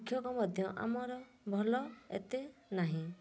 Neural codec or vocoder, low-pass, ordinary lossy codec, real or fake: none; none; none; real